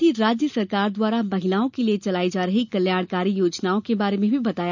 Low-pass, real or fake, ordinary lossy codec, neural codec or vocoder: 7.2 kHz; real; none; none